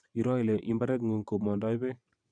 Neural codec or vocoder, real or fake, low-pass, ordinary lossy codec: vocoder, 22.05 kHz, 80 mel bands, WaveNeXt; fake; 9.9 kHz; none